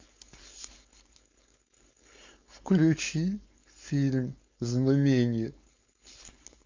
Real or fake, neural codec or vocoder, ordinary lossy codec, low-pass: fake; codec, 16 kHz, 4.8 kbps, FACodec; MP3, 48 kbps; 7.2 kHz